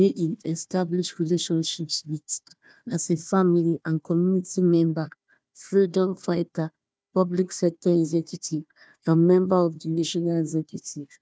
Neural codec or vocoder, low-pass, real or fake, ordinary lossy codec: codec, 16 kHz, 1 kbps, FunCodec, trained on Chinese and English, 50 frames a second; none; fake; none